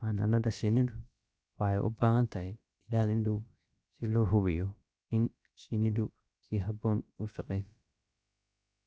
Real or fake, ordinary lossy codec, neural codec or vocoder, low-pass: fake; none; codec, 16 kHz, about 1 kbps, DyCAST, with the encoder's durations; none